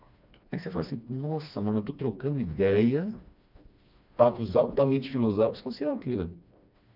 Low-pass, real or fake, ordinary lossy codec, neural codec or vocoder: 5.4 kHz; fake; none; codec, 16 kHz, 2 kbps, FreqCodec, smaller model